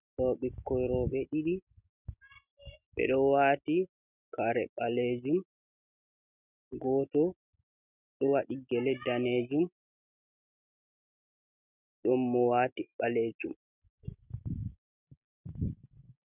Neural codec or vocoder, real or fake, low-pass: none; real; 3.6 kHz